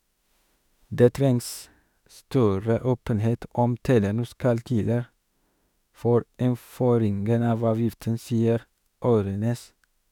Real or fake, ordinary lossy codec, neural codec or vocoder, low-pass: fake; none; autoencoder, 48 kHz, 32 numbers a frame, DAC-VAE, trained on Japanese speech; 19.8 kHz